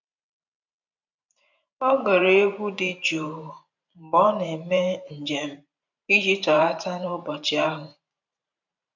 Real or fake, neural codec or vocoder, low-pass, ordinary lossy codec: fake; vocoder, 44.1 kHz, 128 mel bands every 512 samples, BigVGAN v2; 7.2 kHz; none